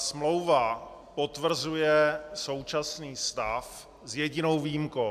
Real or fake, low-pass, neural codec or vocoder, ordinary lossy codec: real; 14.4 kHz; none; AAC, 96 kbps